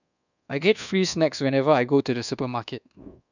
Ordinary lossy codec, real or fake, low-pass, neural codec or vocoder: none; fake; 7.2 kHz; codec, 24 kHz, 1.2 kbps, DualCodec